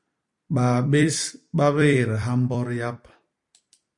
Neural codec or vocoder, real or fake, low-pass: vocoder, 44.1 kHz, 128 mel bands every 256 samples, BigVGAN v2; fake; 10.8 kHz